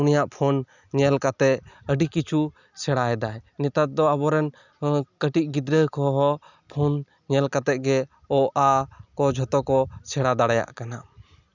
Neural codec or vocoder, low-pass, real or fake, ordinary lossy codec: none; 7.2 kHz; real; none